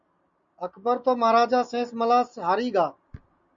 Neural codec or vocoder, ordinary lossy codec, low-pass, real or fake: none; MP3, 48 kbps; 7.2 kHz; real